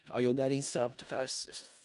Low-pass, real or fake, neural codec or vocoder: 10.8 kHz; fake; codec, 16 kHz in and 24 kHz out, 0.4 kbps, LongCat-Audio-Codec, four codebook decoder